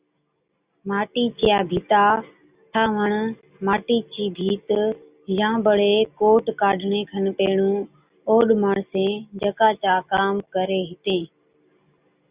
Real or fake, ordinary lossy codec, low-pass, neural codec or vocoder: real; Opus, 64 kbps; 3.6 kHz; none